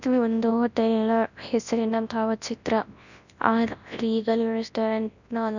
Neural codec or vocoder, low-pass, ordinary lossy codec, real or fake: codec, 24 kHz, 0.9 kbps, WavTokenizer, large speech release; 7.2 kHz; none; fake